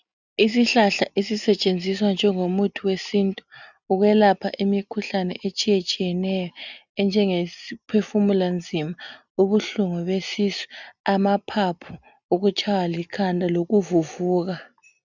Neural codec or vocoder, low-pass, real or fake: none; 7.2 kHz; real